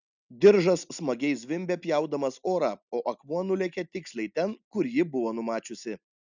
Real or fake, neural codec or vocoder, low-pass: real; none; 7.2 kHz